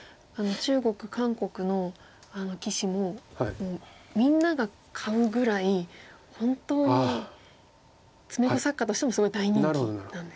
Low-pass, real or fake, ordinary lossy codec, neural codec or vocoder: none; real; none; none